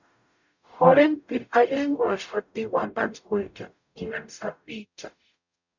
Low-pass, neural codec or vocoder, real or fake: 7.2 kHz; codec, 44.1 kHz, 0.9 kbps, DAC; fake